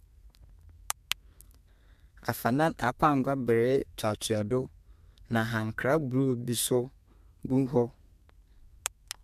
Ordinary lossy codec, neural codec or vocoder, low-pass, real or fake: MP3, 96 kbps; codec, 32 kHz, 1.9 kbps, SNAC; 14.4 kHz; fake